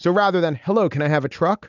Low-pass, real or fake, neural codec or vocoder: 7.2 kHz; real; none